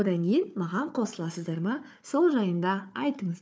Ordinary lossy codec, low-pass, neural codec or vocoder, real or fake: none; none; codec, 16 kHz, 4 kbps, FunCodec, trained on Chinese and English, 50 frames a second; fake